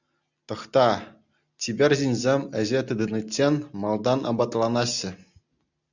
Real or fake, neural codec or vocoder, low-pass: real; none; 7.2 kHz